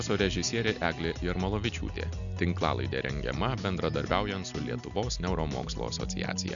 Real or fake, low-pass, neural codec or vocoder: real; 7.2 kHz; none